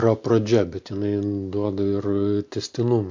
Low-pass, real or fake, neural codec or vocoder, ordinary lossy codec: 7.2 kHz; real; none; MP3, 48 kbps